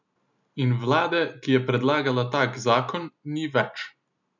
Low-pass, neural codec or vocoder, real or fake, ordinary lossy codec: 7.2 kHz; none; real; none